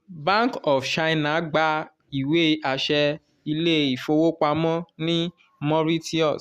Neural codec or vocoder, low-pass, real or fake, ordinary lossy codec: none; 14.4 kHz; real; Opus, 64 kbps